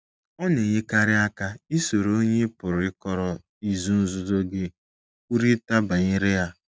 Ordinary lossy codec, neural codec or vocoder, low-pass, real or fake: none; none; none; real